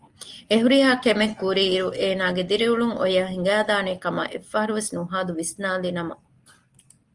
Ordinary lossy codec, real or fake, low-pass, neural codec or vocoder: Opus, 24 kbps; real; 10.8 kHz; none